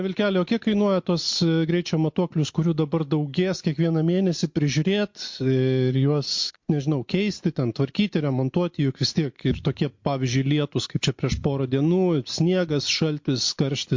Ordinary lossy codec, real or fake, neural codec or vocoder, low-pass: MP3, 48 kbps; real; none; 7.2 kHz